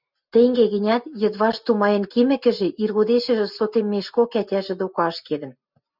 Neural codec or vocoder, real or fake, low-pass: none; real; 5.4 kHz